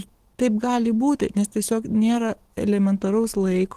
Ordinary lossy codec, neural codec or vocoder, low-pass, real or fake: Opus, 16 kbps; autoencoder, 48 kHz, 128 numbers a frame, DAC-VAE, trained on Japanese speech; 14.4 kHz; fake